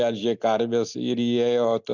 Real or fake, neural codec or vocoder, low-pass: real; none; 7.2 kHz